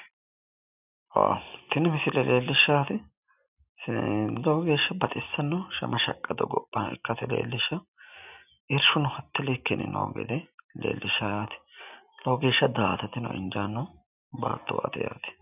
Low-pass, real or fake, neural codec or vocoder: 3.6 kHz; real; none